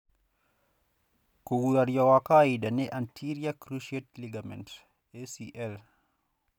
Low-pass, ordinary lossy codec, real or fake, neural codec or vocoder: 19.8 kHz; none; real; none